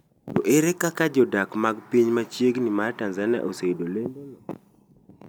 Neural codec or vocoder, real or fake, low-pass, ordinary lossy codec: none; real; none; none